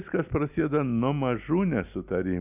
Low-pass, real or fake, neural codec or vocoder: 3.6 kHz; real; none